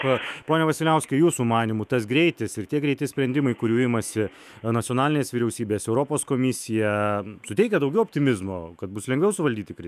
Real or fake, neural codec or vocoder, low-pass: fake; autoencoder, 48 kHz, 128 numbers a frame, DAC-VAE, trained on Japanese speech; 14.4 kHz